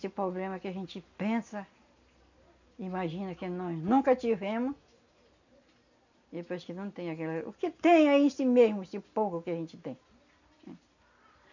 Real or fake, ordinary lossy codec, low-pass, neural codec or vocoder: real; none; 7.2 kHz; none